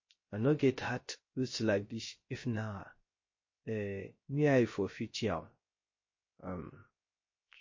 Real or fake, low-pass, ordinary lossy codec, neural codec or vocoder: fake; 7.2 kHz; MP3, 32 kbps; codec, 16 kHz, 0.3 kbps, FocalCodec